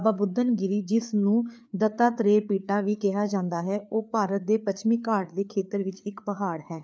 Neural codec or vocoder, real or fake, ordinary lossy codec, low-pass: codec, 16 kHz, 4 kbps, FreqCodec, larger model; fake; none; none